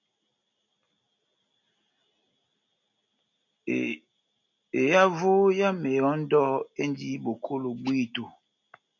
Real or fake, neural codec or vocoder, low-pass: real; none; 7.2 kHz